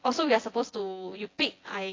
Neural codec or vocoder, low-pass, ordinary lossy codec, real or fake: vocoder, 24 kHz, 100 mel bands, Vocos; 7.2 kHz; AAC, 32 kbps; fake